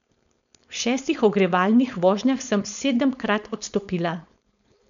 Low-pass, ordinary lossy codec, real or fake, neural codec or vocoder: 7.2 kHz; none; fake; codec, 16 kHz, 4.8 kbps, FACodec